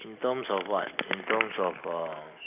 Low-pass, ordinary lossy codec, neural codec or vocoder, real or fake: 3.6 kHz; none; none; real